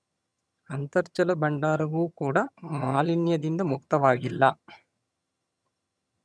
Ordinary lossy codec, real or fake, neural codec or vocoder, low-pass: none; fake; vocoder, 22.05 kHz, 80 mel bands, HiFi-GAN; none